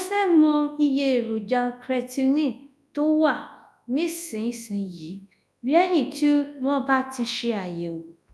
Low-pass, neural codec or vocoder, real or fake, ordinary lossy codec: none; codec, 24 kHz, 0.9 kbps, WavTokenizer, large speech release; fake; none